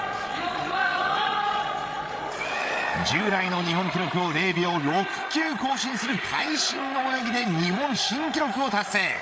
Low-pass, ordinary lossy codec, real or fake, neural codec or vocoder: none; none; fake; codec, 16 kHz, 8 kbps, FreqCodec, larger model